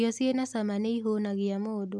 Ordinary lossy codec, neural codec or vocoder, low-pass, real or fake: none; none; none; real